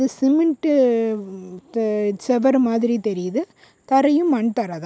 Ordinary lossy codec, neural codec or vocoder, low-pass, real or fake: none; none; none; real